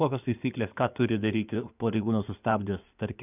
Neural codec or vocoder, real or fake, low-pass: codec, 16 kHz in and 24 kHz out, 2.2 kbps, FireRedTTS-2 codec; fake; 3.6 kHz